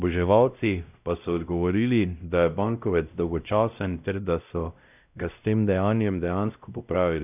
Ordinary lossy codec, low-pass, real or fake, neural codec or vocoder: none; 3.6 kHz; fake; codec, 16 kHz, 0.5 kbps, X-Codec, WavLM features, trained on Multilingual LibriSpeech